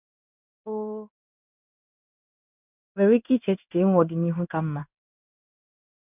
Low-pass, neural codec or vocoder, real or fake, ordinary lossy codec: 3.6 kHz; codec, 16 kHz in and 24 kHz out, 1 kbps, XY-Tokenizer; fake; none